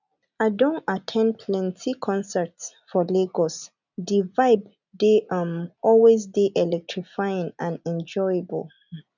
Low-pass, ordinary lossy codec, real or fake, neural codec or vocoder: 7.2 kHz; none; real; none